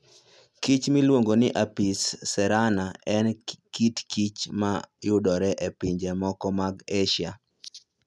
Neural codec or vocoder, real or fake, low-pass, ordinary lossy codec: none; real; none; none